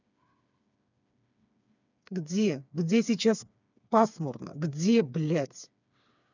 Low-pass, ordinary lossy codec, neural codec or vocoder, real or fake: 7.2 kHz; none; codec, 16 kHz, 4 kbps, FreqCodec, smaller model; fake